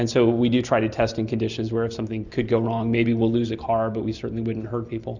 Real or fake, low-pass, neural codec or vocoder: real; 7.2 kHz; none